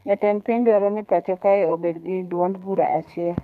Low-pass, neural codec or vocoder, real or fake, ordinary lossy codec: 14.4 kHz; codec, 32 kHz, 1.9 kbps, SNAC; fake; none